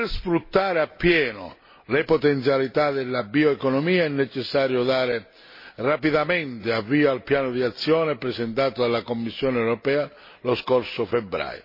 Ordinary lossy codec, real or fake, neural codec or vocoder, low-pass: MP3, 24 kbps; real; none; 5.4 kHz